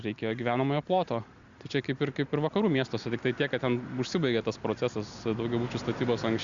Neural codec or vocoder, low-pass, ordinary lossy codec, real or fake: none; 7.2 kHz; Opus, 64 kbps; real